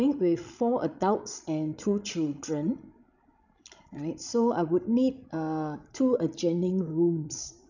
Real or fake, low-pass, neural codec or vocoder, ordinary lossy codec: fake; 7.2 kHz; codec, 16 kHz, 16 kbps, FreqCodec, larger model; none